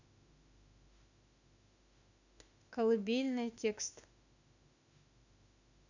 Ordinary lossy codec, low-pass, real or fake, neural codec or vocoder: none; 7.2 kHz; fake; autoencoder, 48 kHz, 32 numbers a frame, DAC-VAE, trained on Japanese speech